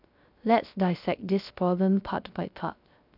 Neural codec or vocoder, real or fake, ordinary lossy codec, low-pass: codec, 16 kHz, 0.3 kbps, FocalCodec; fake; none; 5.4 kHz